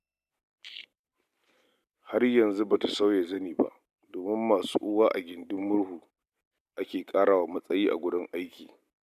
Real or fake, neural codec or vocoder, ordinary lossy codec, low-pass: real; none; MP3, 96 kbps; 14.4 kHz